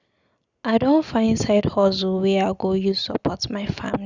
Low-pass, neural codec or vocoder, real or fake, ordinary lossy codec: 7.2 kHz; none; real; Opus, 64 kbps